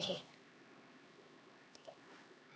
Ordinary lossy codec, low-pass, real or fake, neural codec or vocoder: none; none; fake; codec, 16 kHz, 2 kbps, X-Codec, HuBERT features, trained on LibriSpeech